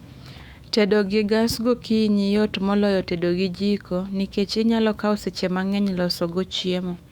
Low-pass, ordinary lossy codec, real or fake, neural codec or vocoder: 19.8 kHz; Opus, 64 kbps; fake; autoencoder, 48 kHz, 128 numbers a frame, DAC-VAE, trained on Japanese speech